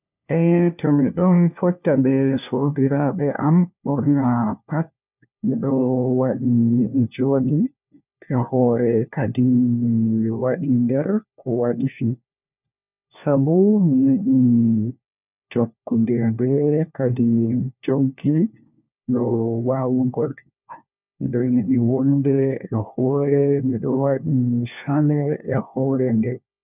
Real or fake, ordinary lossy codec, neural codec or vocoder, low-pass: fake; none; codec, 16 kHz, 1 kbps, FunCodec, trained on LibriTTS, 50 frames a second; 3.6 kHz